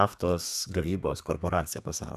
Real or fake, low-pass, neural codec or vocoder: fake; 14.4 kHz; codec, 32 kHz, 1.9 kbps, SNAC